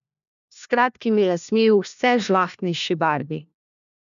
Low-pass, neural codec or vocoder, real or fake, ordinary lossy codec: 7.2 kHz; codec, 16 kHz, 1 kbps, FunCodec, trained on LibriTTS, 50 frames a second; fake; none